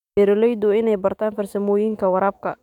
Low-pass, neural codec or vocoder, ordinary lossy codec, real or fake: 19.8 kHz; autoencoder, 48 kHz, 128 numbers a frame, DAC-VAE, trained on Japanese speech; none; fake